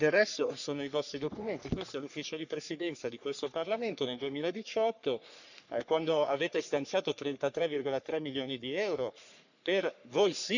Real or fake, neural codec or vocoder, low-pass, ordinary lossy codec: fake; codec, 44.1 kHz, 3.4 kbps, Pupu-Codec; 7.2 kHz; none